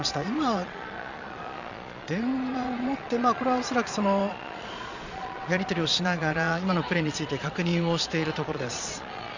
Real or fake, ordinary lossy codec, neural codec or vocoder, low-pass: fake; Opus, 64 kbps; vocoder, 22.05 kHz, 80 mel bands, Vocos; 7.2 kHz